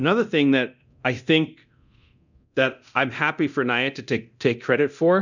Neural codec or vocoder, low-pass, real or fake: codec, 24 kHz, 0.9 kbps, DualCodec; 7.2 kHz; fake